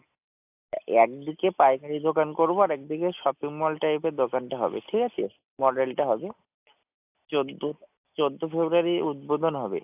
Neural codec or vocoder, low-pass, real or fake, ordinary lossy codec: none; 3.6 kHz; real; AAC, 32 kbps